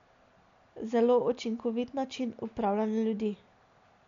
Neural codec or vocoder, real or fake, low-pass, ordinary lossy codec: none; real; 7.2 kHz; MP3, 64 kbps